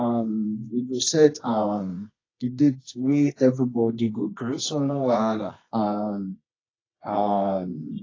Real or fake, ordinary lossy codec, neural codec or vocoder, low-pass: fake; AAC, 32 kbps; codec, 24 kHz, 0.9 kbps, WavTokenizer, medium music audio release; 7.2 kHz